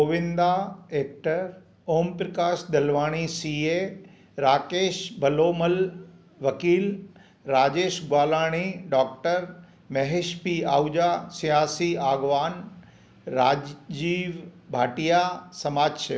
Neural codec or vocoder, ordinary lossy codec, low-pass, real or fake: none; none; none; real